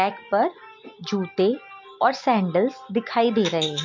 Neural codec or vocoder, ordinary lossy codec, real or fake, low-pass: none; MP3, 48 kbps; real; 7.2 kHz